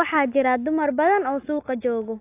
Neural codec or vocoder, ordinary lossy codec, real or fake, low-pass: none; AAC, 24 kbps; real; 3.6 kHz